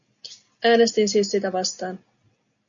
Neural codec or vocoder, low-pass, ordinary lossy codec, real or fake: none; 7.2 kHz; MP3, 96 kbps; real